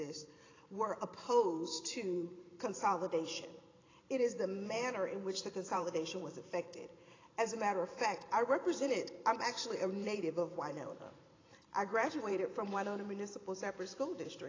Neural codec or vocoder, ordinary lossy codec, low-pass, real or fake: vocoder, 44.1 kHz, 128 mel bands every 512 samples, BigVGAN v2; AAC, 32 kbps; 7.2 kHz; fake